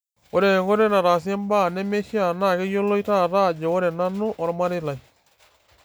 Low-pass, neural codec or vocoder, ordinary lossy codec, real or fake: none; none; none; real